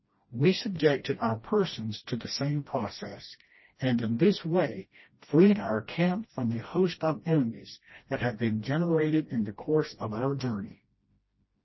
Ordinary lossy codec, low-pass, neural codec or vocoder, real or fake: MP3, 24 kbps; 7.2 kHz; codec, 16 kHz, 1 kbps, FreqCodec, smaller model; fake